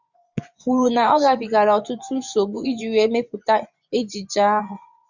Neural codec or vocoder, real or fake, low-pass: vocoder, 24 kHz, 100 mel bands, Vocos; fake; 7.2 kHz